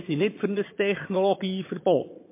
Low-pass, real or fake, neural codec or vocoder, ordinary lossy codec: 3.6 kHz; fake; vocoder, 22.05 kHz, 80 mel bands, HiFi-GAN; MP3, 24 kbps